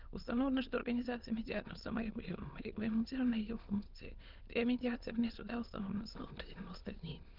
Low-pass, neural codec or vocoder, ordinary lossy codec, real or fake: 5.4 kHz; autoencoder, 22.05 kHz, a latent of 192 numbers a frame, VITS, trained on many speakers; Opus, 16 kbps; fake